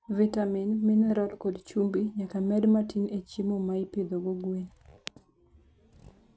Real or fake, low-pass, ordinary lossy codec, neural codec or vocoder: real; none; none; none